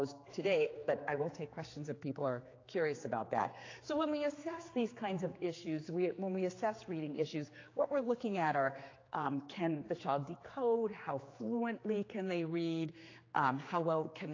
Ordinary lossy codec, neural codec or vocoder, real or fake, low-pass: AAC, 32 kbps; codec, 16 kHz, 4 kbps, X-Codec, HuBERT features, trained on general audio; fake; 7.2 kHz